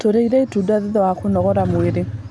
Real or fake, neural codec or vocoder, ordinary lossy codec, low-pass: real; none; none; none